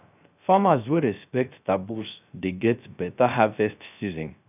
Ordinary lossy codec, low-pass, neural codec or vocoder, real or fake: none; 3.6 kHz; codec, 16 kHz, 0.3 kbps, FocalCodec; fake